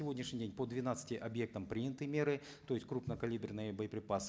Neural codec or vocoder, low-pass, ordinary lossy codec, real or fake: none; none; none; real